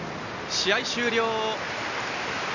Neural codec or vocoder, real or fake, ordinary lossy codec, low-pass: none; real; none; 7.2 kHz